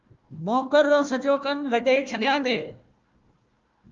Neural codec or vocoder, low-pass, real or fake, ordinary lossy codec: codec, 16 kHz, 1 kbps, FunCodec, trained on Chinese and English, 50 frames a second; 7.2 kHz; fake; Opus, 32 kbps